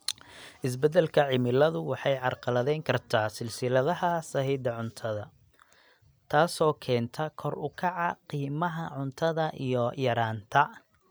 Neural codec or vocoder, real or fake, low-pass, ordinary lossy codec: none; real; none; none